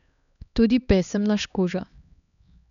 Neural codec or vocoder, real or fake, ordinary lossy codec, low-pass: codec, 16 kHz, 2 kbps, X-Codec, HuBERT features, trained on LibriSpeech; fake; none; 7.2 kHz